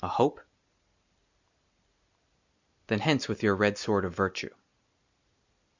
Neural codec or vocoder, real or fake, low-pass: none; real; 7.2 kHz